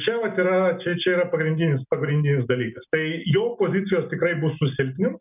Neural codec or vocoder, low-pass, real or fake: none; 3.6 kHz; real